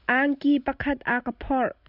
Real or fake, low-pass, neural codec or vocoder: real; 5.4 kHz; none